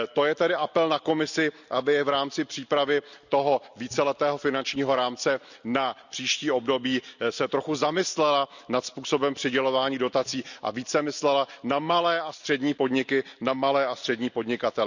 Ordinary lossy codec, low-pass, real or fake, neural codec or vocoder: none; 7.2 kHz; real; none